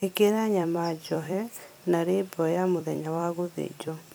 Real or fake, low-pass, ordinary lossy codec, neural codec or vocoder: fake; none; none; vocoder, 44.1 kHz, 128 mel bands every 256 samples, BigVGAN v2